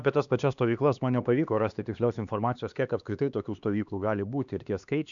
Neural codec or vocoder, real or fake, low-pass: codec, 16 kHz, 2 kbps, X-Codec, HuBERT features, trained on LibriSpeech; fake; 7.2 kHz